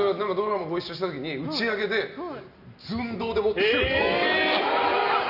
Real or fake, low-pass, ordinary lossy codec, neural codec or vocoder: real; 5.4 kHz; none; none